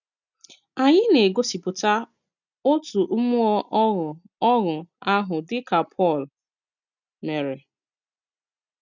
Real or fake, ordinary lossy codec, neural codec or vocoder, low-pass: real; none; none; 7.2 kHz